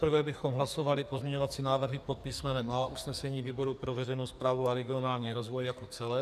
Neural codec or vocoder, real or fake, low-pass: codec, 44.1 kHz, 2.6 kbps, SNAC; fake; 14.4 kHz